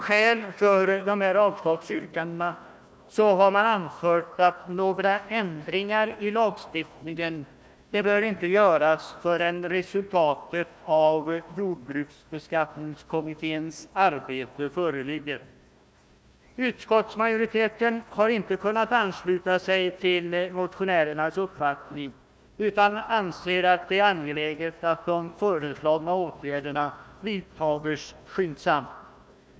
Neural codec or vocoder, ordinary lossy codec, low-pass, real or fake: codec, 16 kHz, 1 kbps, FunCodec, trained on Chinese and English, 50 frames a second; none; none; fake